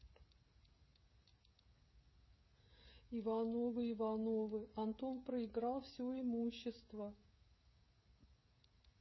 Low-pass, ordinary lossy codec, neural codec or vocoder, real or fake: 7.2 kHz; MP3, 24 kbps; codec, 16 kHz, 16 kbps, FreqCodec, smaller model; fake